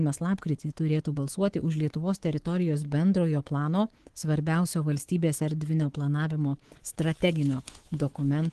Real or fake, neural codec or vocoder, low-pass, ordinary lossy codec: fake; codec, 24 kHz, 3.1 kbps, DualCodec; 10.8 kHz; Opus, 16 kbps